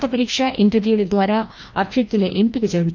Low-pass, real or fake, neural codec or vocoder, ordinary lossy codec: 7.2 kHz; fake; codec, 16 kHz, 1 kbps, FreqCodec, larger model; MP3, 64 kbps